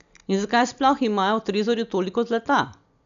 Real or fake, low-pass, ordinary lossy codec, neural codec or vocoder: real; 7.2 kHz; none; none